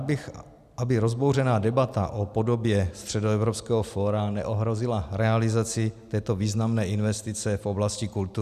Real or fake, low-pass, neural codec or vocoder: real; 14.4 kHz; none